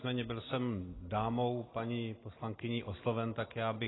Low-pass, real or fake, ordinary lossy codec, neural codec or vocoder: 7.2 kHz; real; AAC, 16 kbps; none